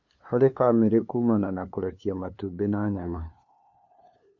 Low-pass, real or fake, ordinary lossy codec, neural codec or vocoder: 7.2 kHz; fake; MP3, 48 kbps; codec, 16 kHz, 2 kbps, FunCodec, trained on LibriTTS, 25 frames a second